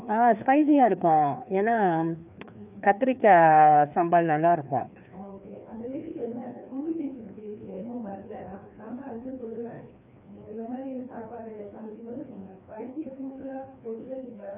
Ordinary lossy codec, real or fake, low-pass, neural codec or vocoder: none; fake; 3.6 kHz; codec, 16 kHz, 2 kbps, FreqCodec, larger model